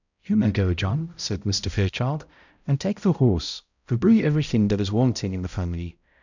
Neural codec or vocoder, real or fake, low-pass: codec, 16 kHz, 0.5 kbps, X-Codec, HuBERT features, trained on balanced general audio; fake; 7.2 kHz